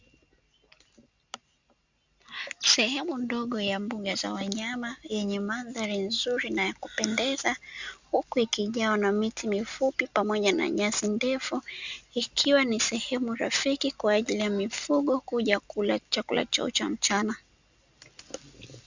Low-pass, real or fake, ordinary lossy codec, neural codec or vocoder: 7.2 kHz; real; Opus, 64 kbps; none